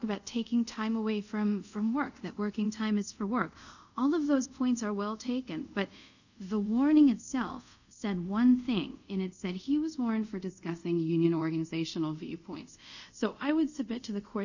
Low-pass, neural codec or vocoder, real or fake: 7.2 kHz; codec, 24 kHz, 0.5 kbps, DualCodec; fake